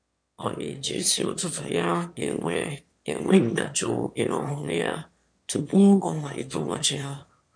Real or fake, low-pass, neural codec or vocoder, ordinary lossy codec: fake; 9.9 kHz; autoencoder, 22.05 kHz, a latent of 192 numbers a frame, VITS, trained on one speaker; MP3, 64 kbps